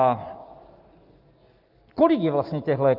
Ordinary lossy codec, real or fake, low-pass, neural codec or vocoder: Opus, 24 kbps; real; 5.4 kHz; none